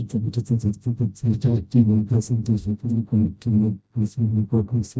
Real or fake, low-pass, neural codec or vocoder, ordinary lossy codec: fake; none; codec, 16 kHz, 0.5 kbps, FreqCodec, smaller model; none